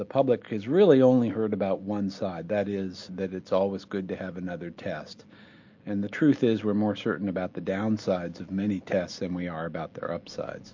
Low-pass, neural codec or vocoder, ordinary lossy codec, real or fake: 7.2 kHz; none; MP3, 48 kbps; real